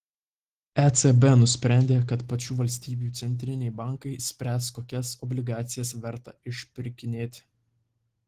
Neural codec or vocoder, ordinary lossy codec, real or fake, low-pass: none; Opus, 16 kbps; real; 14.4 kHz